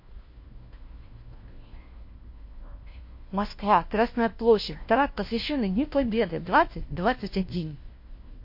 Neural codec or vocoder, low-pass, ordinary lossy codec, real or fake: codec, 16 kHz, 1 kbps, FunCodec, trained on LibriTTS, 50 frames a second; 5.4 kHz; MP3, 32 kbps; fake